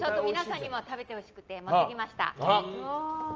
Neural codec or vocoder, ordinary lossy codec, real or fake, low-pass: none; Opus, 24 kbps; real; 7.2 kHz